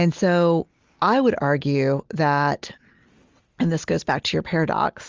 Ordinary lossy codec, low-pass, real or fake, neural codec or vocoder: Opus, 32 kbps; 7.2 kHz; real; none